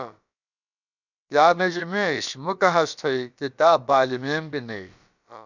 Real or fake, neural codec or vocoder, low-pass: fake; codec, 16 kHz, about 1 kbps, DyCAST, with the encoder's durations; 7.2 kHz